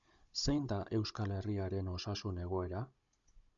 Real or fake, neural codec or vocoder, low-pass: fake; codec, 16 kHz, 16 kbps, FunCodec, trained on Chinese and English, 50 frames a second; 7.2 kHz